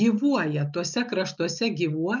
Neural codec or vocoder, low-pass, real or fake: none; 7.2 kHz; real